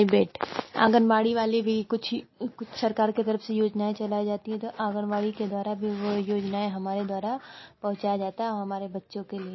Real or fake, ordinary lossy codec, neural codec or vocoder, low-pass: real; MP3, 24 kbps; none; 7.2 kHz